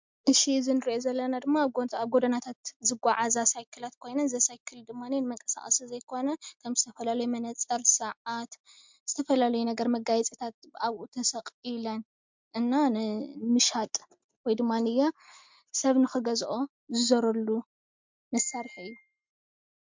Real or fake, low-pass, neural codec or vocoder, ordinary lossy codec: real; 7.2 kHz; none; MP3, 64 kbps